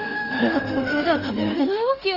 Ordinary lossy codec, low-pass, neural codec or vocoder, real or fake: Opus, 24 kbps; 5.4 kHz; autoencoder, 48 kHz, 32 numbers a frame, DAC-VAE, trained on Japanese speech; fake